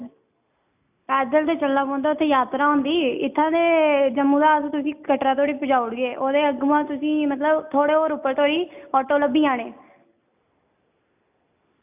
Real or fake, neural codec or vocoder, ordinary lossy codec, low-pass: real; none; none; 3.6 kHz